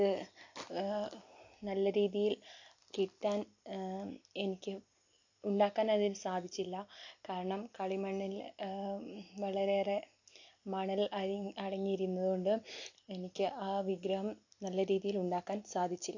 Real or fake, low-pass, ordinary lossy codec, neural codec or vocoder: real; 7.2 kHz; AAC, 48 kbps; none